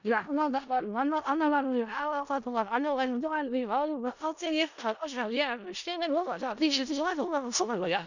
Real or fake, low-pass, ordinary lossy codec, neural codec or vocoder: fake; 7.2 kHz; none; codec, 16 kHz in and 24 kHz out, 0.4 kbps, LongCat-Audio-Codec, four codebook decoder